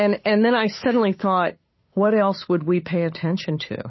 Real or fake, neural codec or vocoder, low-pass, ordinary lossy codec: fake; codec, 16 kHz, 8 kbps, FunCodec, trained on Chinese and English, 25 frames a second; 7.2 kHz; MP3, 24 kbps